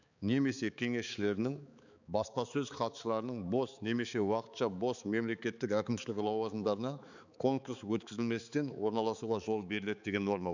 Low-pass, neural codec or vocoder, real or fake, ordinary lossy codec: 7.2 kHz; codec, 16 kHz, 4 kbps, X-Codec, HuBERT features, trained on balanced general audio; fake; none